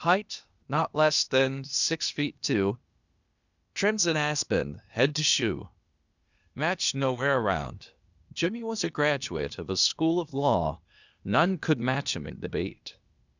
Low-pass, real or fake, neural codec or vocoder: 7.2 kHz; fake; codec, 16 kHz, 0.8 kbps, ZipCodec